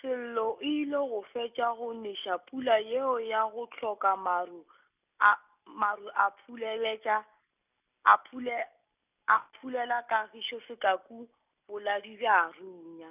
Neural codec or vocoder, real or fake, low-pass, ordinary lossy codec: none; real; 3.6 kHz; none